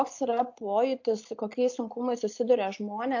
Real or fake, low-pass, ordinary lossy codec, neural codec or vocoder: real; 7.2 kHz; AAC, 48 kbps; none